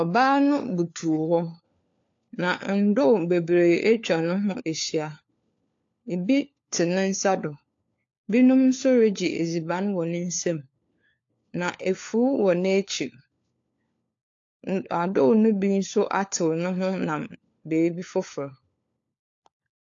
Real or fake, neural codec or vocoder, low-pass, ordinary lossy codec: fake; codec, 16 kHz, 4 kbps, FunCodec, trained on LibriTTS, 50 frames a second; 7.2 kHz; AAC, 48 kbps